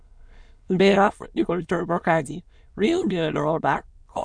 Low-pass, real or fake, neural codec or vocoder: 9.9 kHz; fake; autoencoder, 22.05 kHz, a latent of 192 numbers a frame, VITS, trained on many speakers